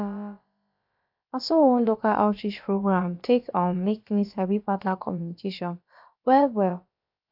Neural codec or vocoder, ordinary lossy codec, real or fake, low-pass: codec, 16 kHz, about 1 kbps, DyCAST, with the encoder's durations; AAC, 48 kbps; fake; 5.4 kHz